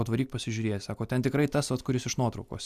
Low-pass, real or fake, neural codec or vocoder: 14.4 kHz; real; none